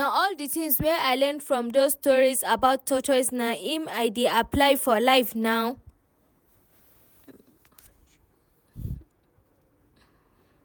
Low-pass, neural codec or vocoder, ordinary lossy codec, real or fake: none; vocoder, 48 kHz, 128 mel bands, Vocos; none; fake